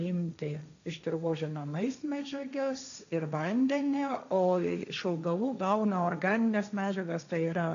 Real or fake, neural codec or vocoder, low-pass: fake; codec, 16 kHz, 1.1 kbps, Voila-Tokenizer; 7.2 kHz